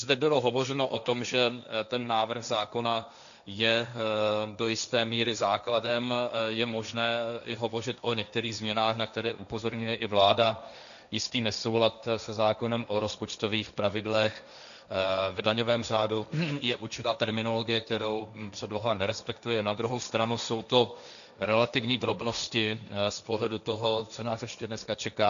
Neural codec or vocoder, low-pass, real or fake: codec, 16 kHz, 1.1 kbps, Voila-Tokenizer; 7.2 kHz; fake